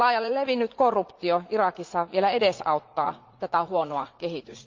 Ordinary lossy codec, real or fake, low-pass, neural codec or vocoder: Opus, 24 kbps; fake; 7.2 kHz; vocoder, 44.1 kHz, 80 mel bands, Vocos